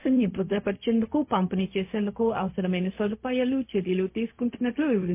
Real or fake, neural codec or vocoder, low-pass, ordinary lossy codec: fake; codec, 16 kHz, 0.4 kbps, LongCat-Audio-Codec; 3.6 kHz; MP3, 24 kbps